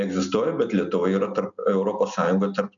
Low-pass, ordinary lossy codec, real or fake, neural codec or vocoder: 7.2 kHz; MP3, 96 kbps; real; none